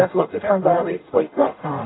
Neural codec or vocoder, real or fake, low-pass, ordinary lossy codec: codec, 44.1 kHz, 0.9 kbps, DAC; fake; 7.2 kHz; AAC, 16 kbps